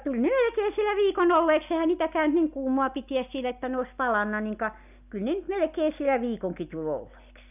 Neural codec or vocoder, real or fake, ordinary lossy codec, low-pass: none; real; none; 3.6 kHz